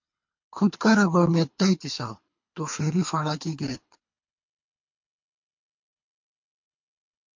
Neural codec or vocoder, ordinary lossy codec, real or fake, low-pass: codec, 24 kHz, 3 kbps, HILCodec; MP3, 48 kbps; fake; 7.2 kHz